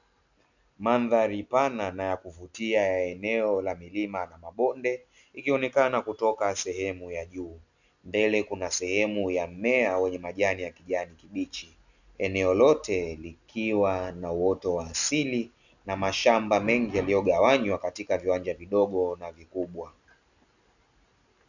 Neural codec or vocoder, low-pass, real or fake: none; 7.2 kHz; real